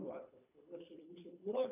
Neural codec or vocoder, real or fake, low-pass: codec, 24 kHz, 3 kbps, HILCodec; fake; 3.6 kHz